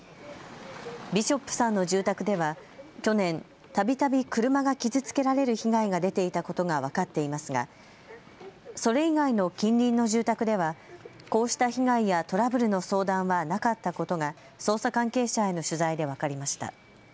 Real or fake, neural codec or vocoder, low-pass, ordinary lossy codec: real; none; none; none